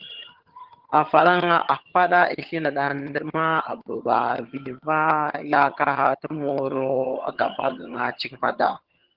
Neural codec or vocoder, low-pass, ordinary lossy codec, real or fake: vocoder, 22.05 kHz, 80 mel bands, HiFi-GAN; 5.4 kHz; Opus, 16 kbps; fake